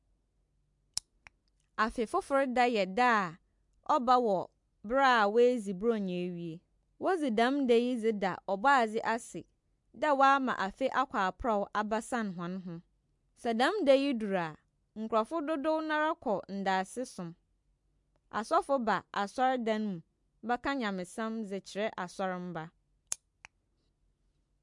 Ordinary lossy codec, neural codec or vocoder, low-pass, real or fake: MP3, 64 kbps; none; 10.8 kHz; real